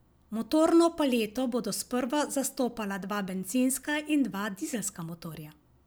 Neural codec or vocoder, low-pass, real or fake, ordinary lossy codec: none; none; real; none